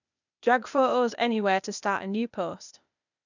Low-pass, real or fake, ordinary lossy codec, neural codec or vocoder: 7.2 kHz; fake; none; codec, 16 kHz, 0.8 kbps, ZipCodec